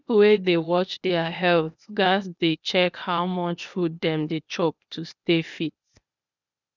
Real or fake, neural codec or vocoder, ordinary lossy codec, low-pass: fake; codec, 16 kHz, 0.8 kbps, ZipCodec; none; 7.2 kHz